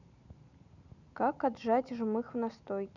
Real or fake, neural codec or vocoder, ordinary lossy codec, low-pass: real; none; none; 7.2 kHz